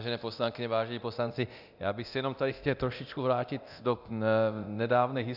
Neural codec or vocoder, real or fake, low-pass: codec, 24 kHz, 0.9 kbps, DualCodec; fake; 5.4 kHz